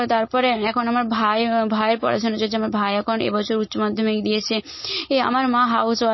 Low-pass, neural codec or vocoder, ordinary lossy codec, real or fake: 7.2 kHz; none; MP3, 24 kbps; real